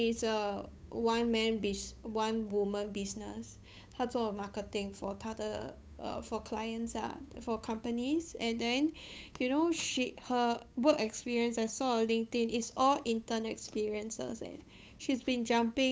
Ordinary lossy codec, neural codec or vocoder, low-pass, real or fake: none; codec, 16 kHz, 8 kbps, FunCodec, trained on Chinese and English, 25 frames a second; none; fake